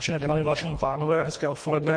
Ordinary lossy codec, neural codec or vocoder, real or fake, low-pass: MP3, 48 kbps; codec, 24 kHz, 1.5 kbps, HILCodec; fake; 9.9 kHz